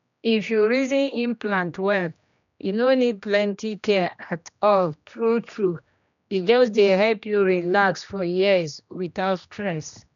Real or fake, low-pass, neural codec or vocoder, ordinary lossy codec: fake; 7.2 kHz; codec, 16 kHz, 1 kbps, X-Codec, HuBERT features, trained on general audio; none